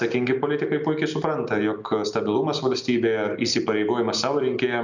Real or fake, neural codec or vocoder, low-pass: real; none; 7.2 kHz